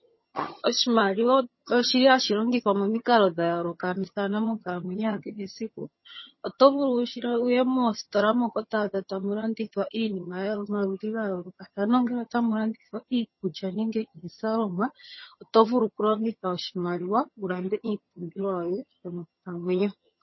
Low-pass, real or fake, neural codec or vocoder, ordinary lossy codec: 7.2 kHz; fake; vocoder, 22.05 kHz, 80 mel bands, HiFi-GAN; MP3, 24 kbps